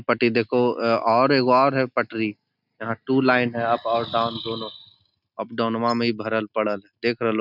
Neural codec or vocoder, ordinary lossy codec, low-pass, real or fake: none; none; 5.4 kHz; real